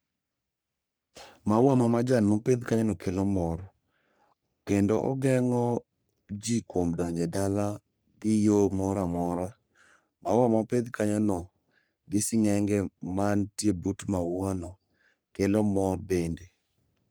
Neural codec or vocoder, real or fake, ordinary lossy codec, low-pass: codec, 44.1 kHz, 3.4 kbps, Pupu-Codec; fake; none; none